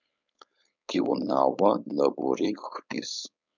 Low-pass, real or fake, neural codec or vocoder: 7.2 kHz; fake; codec, 16 kHz, 4.8 kbps, FACodec